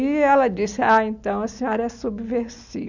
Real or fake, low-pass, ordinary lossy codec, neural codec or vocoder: real; 7.2 kHz; none; none